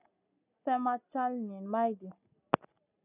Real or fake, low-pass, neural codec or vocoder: real; 3.6 kHz; none